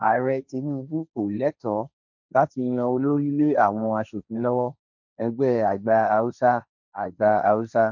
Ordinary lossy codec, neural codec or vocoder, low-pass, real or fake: none; codec, 16 kHz, 1.1 kbps, Voila-Tokenizer; 7.2 kHz; fake